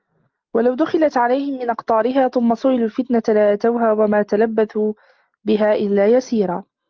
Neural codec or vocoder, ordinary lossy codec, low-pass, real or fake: none; Opus, 32 kbps; 7.2 kHz; real